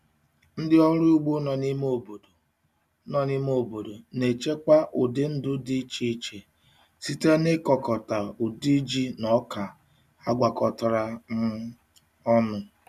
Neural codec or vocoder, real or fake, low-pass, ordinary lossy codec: none; real; 14.4 kHz; none